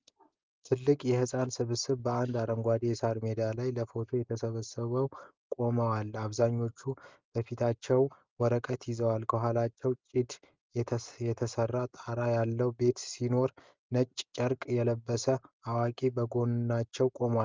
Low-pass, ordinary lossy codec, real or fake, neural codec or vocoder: 7.2 kHz; Opus, 32 kbps; real; none